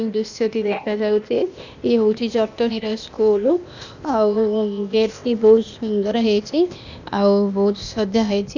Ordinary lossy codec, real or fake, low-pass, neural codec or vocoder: none; fake; 7.2 kHz; codec, 16 kHz, 0.8 kbps, ZipCodec